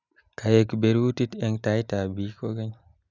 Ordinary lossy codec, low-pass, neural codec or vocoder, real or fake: none; 7.2 kHz; none; real